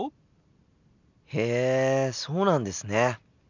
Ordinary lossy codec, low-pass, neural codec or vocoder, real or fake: none; 7.2 kHz; none; real